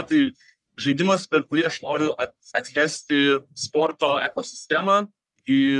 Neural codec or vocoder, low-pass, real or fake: codec, 44.1 kHz, 1.7 kbps, Pupu-Codec; 10.8 kHz; fake